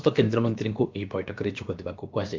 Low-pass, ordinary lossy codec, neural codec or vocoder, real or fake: 7.2 kHz; Opus, 24 kbps; codec, 16 kHz, about 1 kbps, DyCAST, with the encoder's durations; fake